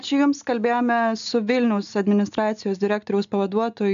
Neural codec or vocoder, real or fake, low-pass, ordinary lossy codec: none; real; 7.2 kHz; MP3, 96 kbps